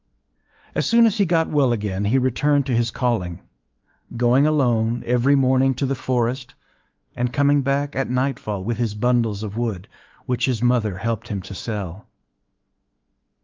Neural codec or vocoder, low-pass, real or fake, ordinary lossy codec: autoencoder, 48 kHz, 128 numbers a frame, DAC-VAE, trained on Japanese speech; 7.2 kHz; fake; Opus, 32 kbps